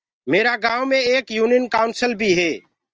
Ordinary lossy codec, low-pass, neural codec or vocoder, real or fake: Opus, 24 kbps; 7.2 kHz; none; real